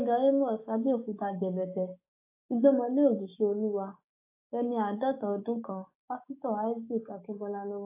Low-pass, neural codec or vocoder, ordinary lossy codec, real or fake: 3.6 kHz; codec, 16 kHz, 6 kbps, DAC; AAC, 24 kbps; fake